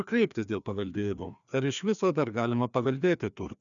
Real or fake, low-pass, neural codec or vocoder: fake; 7.2 kHz; codec, 16 kHz, 2 kbps, FreqCodec, larger model